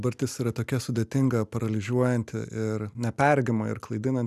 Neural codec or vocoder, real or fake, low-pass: none; real; 14.4 kHz